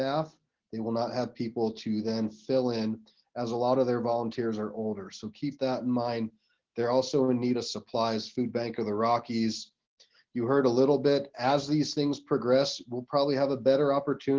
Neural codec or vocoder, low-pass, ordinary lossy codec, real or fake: none; 7.2 kHz; Opus, 16 kbps; real